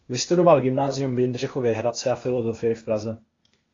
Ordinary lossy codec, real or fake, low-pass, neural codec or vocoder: AAC, 32 kbps; fake; 7.2 kHz; codec, 16 kHz, 0.8 kbps, ZipCodec